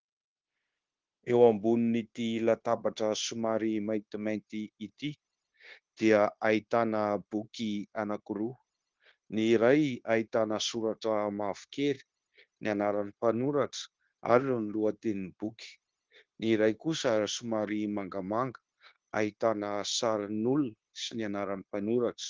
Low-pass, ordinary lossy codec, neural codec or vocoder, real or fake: 7.2 kHz; Opus, 16 kbps; codec, 16 kHz, 0.9 kbps, LongCat-Audio-Codec; fake